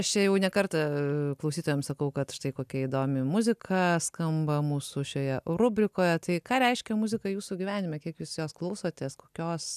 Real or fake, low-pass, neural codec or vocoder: real; 14.4 kHz; none